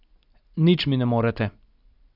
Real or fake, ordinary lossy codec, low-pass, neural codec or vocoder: real; none; 5.4 kHz; none